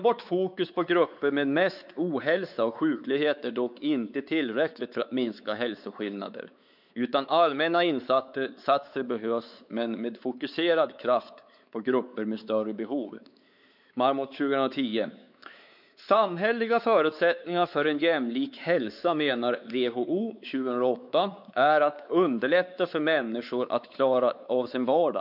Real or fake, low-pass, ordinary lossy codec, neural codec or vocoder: fake; 5.4 kHz; none; codec, 16 kHz, 4 kbps, X-Codec, WavLM features, trained on Multilingual LibriSpeech